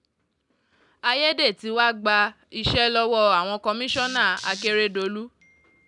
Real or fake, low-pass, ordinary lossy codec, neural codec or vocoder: real; 10.8 kHz; none; none